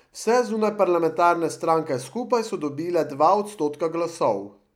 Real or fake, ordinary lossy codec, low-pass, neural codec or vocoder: real; none; 19.8 kHz; none